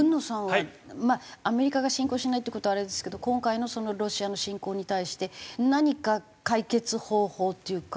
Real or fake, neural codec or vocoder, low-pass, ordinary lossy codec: real; none; none; none